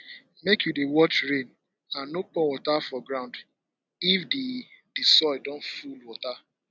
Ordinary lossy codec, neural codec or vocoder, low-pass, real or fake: none; none; none; real